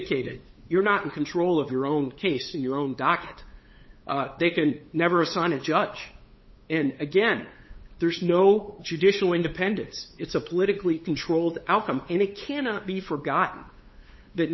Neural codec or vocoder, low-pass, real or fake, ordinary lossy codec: codec, 16 kHz, 8 kbps, FunCodec, trained on LibriTTS, 25 frames a second; 7.2 kHz; fake; MP3, 24 kbps